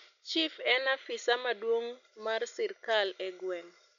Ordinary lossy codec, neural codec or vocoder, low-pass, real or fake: none; none; 7.2 kHz; real